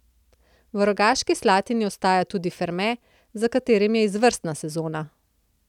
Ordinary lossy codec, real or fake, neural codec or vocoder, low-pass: none; real; none; 19.8 kHz